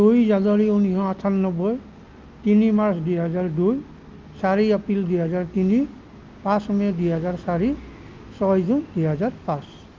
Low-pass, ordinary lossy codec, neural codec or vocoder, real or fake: 7.2 kHz; Opus, 32 kbps; none; real